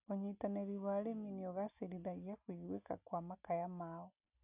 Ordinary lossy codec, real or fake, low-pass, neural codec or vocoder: none; real; 3.6 kHz; none